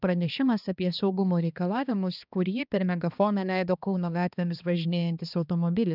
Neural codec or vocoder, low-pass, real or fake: codec, 16 kHz, 2 kbps, X-Codec, HuBERT features, trained on balanced general audio; 5.4 kHz; fake